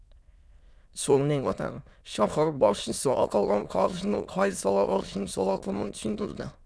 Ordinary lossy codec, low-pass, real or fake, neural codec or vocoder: none; none; fake; autoencoder, 22.05 kHz, a latent of 192 numbers a frame, VITS, trained on many speakers